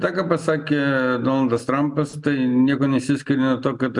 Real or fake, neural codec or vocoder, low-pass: real; none; 10.8 kHz